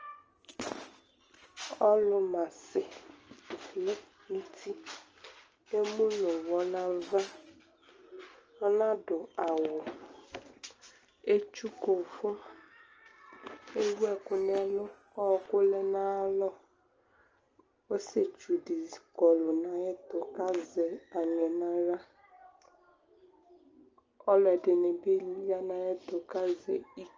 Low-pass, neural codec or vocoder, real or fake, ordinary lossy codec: 7.2 kHz; none; real; Opus, 24 kbps